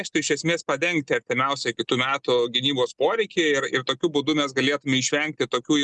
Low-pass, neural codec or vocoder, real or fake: 10.8 kHz; none; real